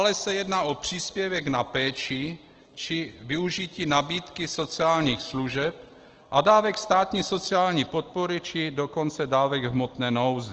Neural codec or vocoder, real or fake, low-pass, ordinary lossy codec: none; real; 7.2 kHz; Opus, 16 kbps